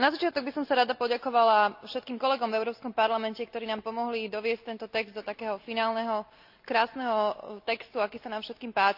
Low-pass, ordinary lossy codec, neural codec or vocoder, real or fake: 5.4 kHz; none; none; real